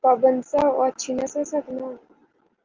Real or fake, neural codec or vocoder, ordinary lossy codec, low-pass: real; none; Opus, 32 kbps; 7.2 kHz